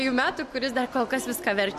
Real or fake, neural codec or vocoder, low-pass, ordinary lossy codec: real; none; 14.4 kHz; MP3, 64 kbps